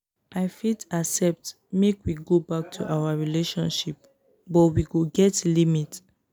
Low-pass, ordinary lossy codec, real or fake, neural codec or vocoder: none; none; real; none